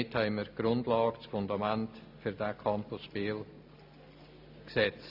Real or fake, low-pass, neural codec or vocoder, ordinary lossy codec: real; 5.4 kHz; none; AAC, 48 kbps